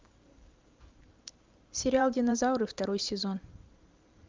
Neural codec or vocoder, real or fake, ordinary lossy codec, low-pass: vocoder, 44.1 kHz, 128 mel bands every 512 samples, BigVGAN v2; fake; Opus, 24 kbps; 7.2 kHz